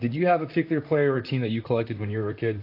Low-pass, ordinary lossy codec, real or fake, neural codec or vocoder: 5.4 kHz; AAC, 32 kbps; fake; vocoder, 44.1 kHz, 128 mel bands, Pupu-Vocoder